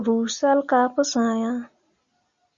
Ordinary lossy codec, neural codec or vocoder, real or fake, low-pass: Opus, 64 kbps; none; real; 7.2 kHz